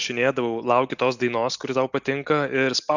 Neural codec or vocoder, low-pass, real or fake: none; 7.2 kHz; real